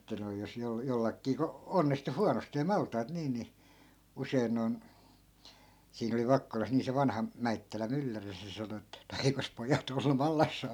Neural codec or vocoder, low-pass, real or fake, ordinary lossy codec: none; 19.8 kHz; real; none